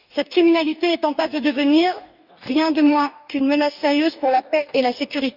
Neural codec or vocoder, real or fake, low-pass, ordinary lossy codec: codec, 44.1 kHz, 2.6 kbps, DAC; fake; 5.4 kHz; none